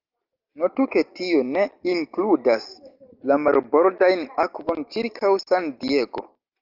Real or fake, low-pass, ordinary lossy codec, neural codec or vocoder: real; 5.4 kHz; Opus, 24 kbps; none